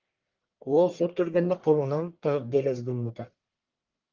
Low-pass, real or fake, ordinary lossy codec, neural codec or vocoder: 7.2 kHz; fake; Opus, 24 kbps; codec, 44.1 kHz, 1.7 kbps, Pupu-Codec